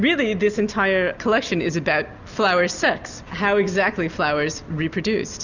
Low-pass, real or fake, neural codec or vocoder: 7.2 kHz; real; none